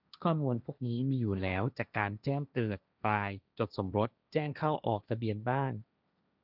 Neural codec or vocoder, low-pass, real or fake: codec, 16 kHz, 1.1 kbps, Voila-Tokenizer; 5.4 kHz; fake